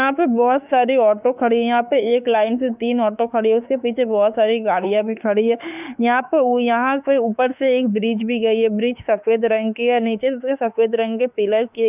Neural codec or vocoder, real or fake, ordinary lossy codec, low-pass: autoencoder, 48 kHz, 32 numbers a frame, DAC-VAE, trained on Japanese speech; fake; none; 3.6 kHz